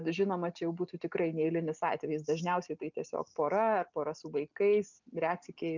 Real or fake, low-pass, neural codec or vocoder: real; 7.2 kHz; none